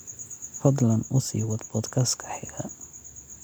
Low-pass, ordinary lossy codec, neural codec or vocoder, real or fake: none; none; none; real